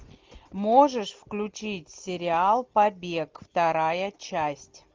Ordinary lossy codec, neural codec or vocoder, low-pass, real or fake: Opus, 24 kbps; none; 7.2 kHz; real